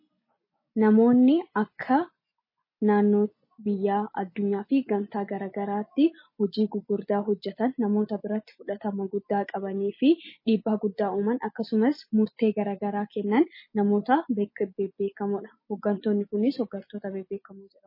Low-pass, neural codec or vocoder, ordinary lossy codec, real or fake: 5.4 kHz; none; MP3, 24 kbps; real